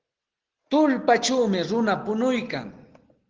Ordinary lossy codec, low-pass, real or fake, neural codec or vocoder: Opus, 16 kbps; 7.2 kHz; real; none